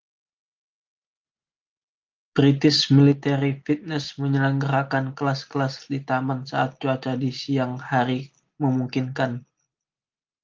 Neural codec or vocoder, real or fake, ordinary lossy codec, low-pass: none; real; Opus, 24 kbps; 7.2 kHz